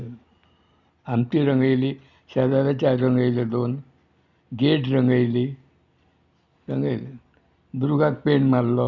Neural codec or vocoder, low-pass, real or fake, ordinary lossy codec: none; 7.2 kHz; real; Opus, 64 kbps